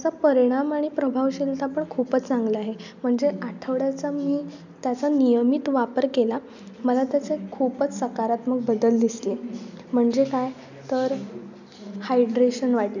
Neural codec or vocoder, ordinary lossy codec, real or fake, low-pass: none; none; real; 7.2 kHz